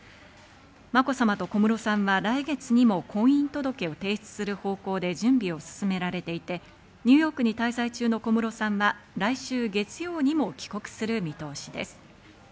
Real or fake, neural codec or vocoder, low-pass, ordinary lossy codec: real; none; none; none